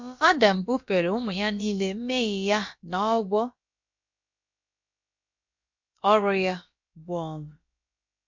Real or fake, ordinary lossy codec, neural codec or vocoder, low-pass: fake; MP3, 48 kbps; codec, 16 kHz, about 1 kbps, DyCAST, with the encoder's durations; 7.2 kHz